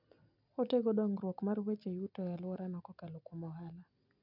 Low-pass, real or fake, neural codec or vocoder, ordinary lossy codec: 5.4 kHz; real; none; none